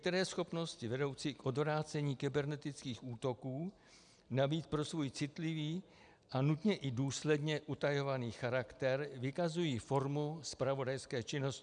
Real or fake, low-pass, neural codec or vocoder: real; 9.9 kHz; none